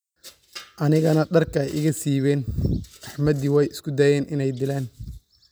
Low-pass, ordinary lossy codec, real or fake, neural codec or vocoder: none; none; real; none